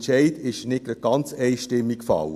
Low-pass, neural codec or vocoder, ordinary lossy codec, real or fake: 14.4 kHz; none; AAC, 96 kbps; real